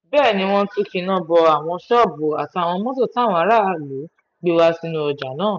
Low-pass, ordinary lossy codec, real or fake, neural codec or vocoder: 7.2 kHz; none; real; none